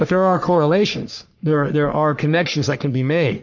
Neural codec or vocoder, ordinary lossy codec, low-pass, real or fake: codec, 44.1 kHz, 3.4 kbps, Pupu-Codec; MP3, 64 kbps; 7.2 kHz; fake